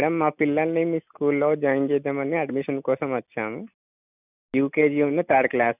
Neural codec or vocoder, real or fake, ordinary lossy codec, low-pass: none; real; none; 3.6 kHz